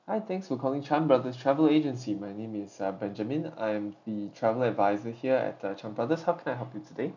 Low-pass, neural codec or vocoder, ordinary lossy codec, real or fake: 7.2 kHz; none; none; real